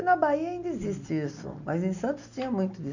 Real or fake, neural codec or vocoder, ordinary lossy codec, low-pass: real; none; none; 7.2 kHz